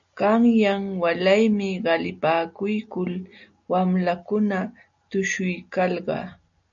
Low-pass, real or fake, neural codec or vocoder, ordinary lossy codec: 7.2 kHz; real; none; AAC, 64 kbps